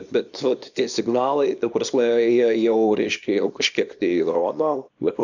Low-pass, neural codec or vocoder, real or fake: 7.2 kHz; codec, 24 kHz, 0.9 kbps, WavTokenizer, small release; fake